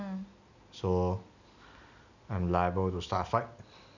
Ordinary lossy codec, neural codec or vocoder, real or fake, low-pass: none; none; real; 7.2 kHz